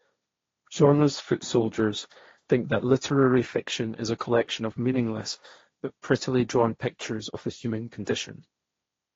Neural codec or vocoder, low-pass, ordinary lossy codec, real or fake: codec, 16 kHz, 1.1 kbps, Voila-Tokenizer; 7.2 kHz; AAC, 32 kbps; fake